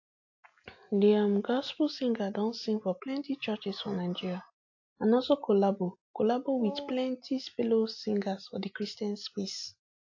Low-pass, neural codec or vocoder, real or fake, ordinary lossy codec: 7.2 kHz; none; real; AAC, 48 kbps